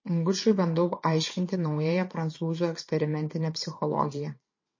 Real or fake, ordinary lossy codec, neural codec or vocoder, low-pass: fake; MP3, 32 kbps; vocoder, 22.05 kHz, 80 mel bands, WaveNeXt; 7.2 kHz